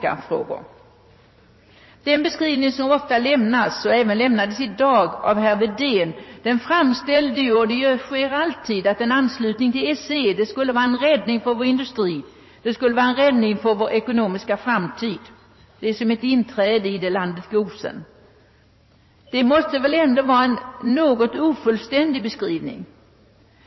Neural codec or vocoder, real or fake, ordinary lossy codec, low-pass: vocoder, 44.1 kHz, 80 mel bands, Vocos; fake; MP3, 24 kbps; 7.2 kHz